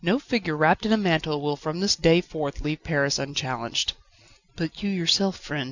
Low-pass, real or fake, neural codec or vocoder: 7.2 kHz; real; none